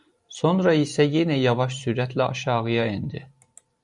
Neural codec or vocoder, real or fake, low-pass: vocoder, 44.1 kHz, 128 mel bands every 512 samples, BigVGAN v2; fake; 10.8 kHz